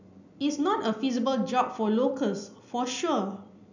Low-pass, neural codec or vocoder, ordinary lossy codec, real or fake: 7.2 kHz; none; none; real